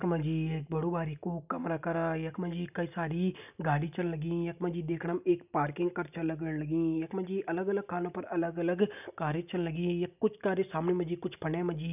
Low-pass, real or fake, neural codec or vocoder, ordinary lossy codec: 3.6 kHz; real; none; none